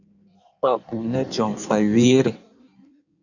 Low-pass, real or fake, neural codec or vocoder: 7.2 kHz; fake; codec, 16 kHz in and 24 kHz out, 1.1 kbps, FireRedTTS-2 codec